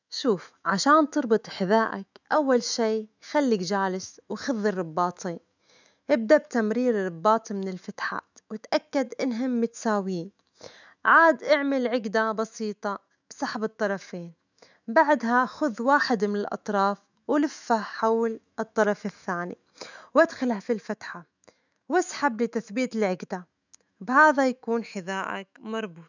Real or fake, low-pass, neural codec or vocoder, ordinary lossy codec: fake; 7.2 kHz; autoencoder, 48 kHz, 128 numbers a frame, DAC-VAE, trained on Japanese speech; none